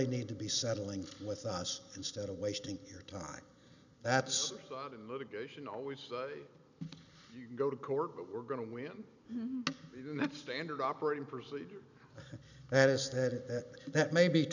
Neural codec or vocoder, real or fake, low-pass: none; real; 7.2 kHz